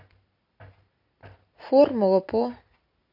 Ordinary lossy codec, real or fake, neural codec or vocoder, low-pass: MP3, 32 kbps; real; none; 5.4 kHz